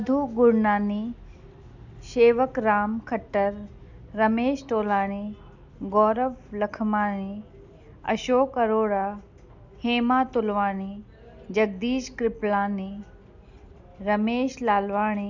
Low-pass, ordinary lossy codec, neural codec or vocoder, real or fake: 7.2 kHz; none; none; real